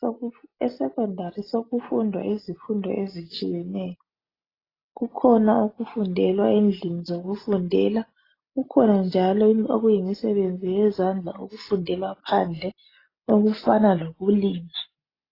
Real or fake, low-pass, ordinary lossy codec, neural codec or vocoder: real; 5.4 kHz; AAC, 24 kbps; none